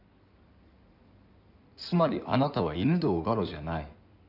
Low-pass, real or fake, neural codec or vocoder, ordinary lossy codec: 5.4 kHz; fake; codec, 16 kHz in and 24 kHz out, 2.2 kbps, FireRedTTS-2 codec; none